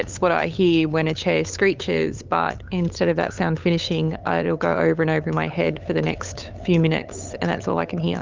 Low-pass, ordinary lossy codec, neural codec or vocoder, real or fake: 7.2 kHz; Opus, 24 kbps; codec, 16 kHz, 8 kbps, FunCodec, trained on LibriTTS, 25 frames a second; fake